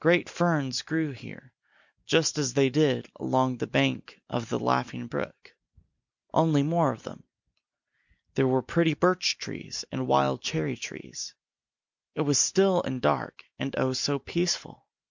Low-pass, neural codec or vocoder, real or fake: 7.2 kHz; none; real